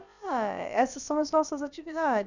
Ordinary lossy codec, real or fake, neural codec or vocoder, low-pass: none; fake; codec, 16 kHz, about 1 kbps, DyCAST, with the encoder's durations; 7.2 kHz